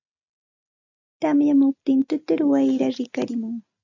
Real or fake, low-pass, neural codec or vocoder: real; 7.2 kHz; none